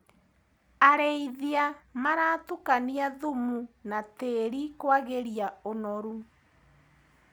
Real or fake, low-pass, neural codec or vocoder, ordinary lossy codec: real; none; none; none